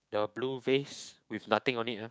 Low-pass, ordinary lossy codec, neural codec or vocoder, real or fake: none; none; codec, 16 kHz, 6 kbps, DAC; fake